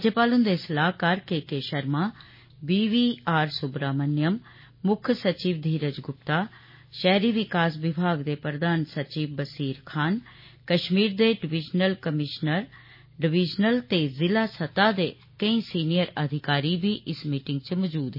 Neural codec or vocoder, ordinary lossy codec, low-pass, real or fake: none; MP3, 24 kbps; 5.4 kHz; real